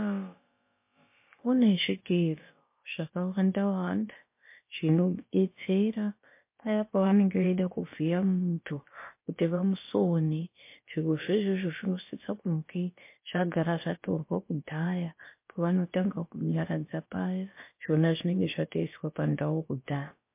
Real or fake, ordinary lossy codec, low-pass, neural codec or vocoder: fake; MP3, 24 kbps; 3.6 kHz; codec, 16 kHz, about 1 kbps, DyCAST, with the encoder's durations